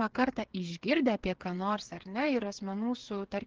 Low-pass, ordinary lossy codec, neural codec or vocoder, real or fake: 7.2 kHz; Opus, 16 kbps; codec, 16 kHz, 8 kbps, FreqCodec, smaller model; fake